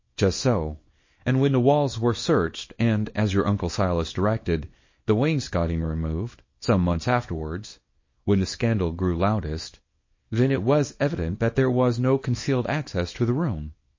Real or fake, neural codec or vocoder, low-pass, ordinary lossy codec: fake; codec, 24 kHz, 0.9 kbps, WavTokenizer, small release; 7.2 kHz; MP3, 32 kbps